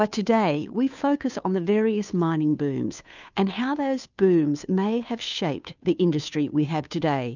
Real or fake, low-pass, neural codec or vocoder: fake; 7.2 kHz; codec, 16 kHz, 2 kbps, FunCodec, trained on Chinese and English, 25 frames a second